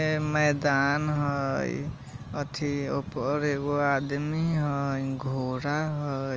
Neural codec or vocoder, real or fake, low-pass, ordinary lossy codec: none; real; 7.2 kHz; Opus, 24 kbps